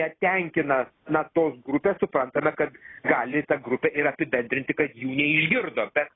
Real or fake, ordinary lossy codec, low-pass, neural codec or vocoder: real; AAC, 16 kbps; 7.2 kHz; none